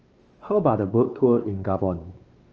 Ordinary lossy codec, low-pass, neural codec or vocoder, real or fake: Opus, 24 kbps; 7.2 kHz; codec, 16 kHz, 2 kbps, X-Codec, WavLM features, trained on Multilingual LibriSpeech; fake